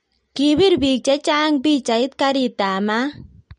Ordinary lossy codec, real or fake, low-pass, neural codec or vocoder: MP3, 48 kbps; real; 9.9 kHz; none